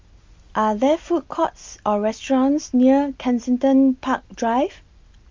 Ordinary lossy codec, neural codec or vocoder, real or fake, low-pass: Opus, 32 kbps; none; real; 7.2 kHz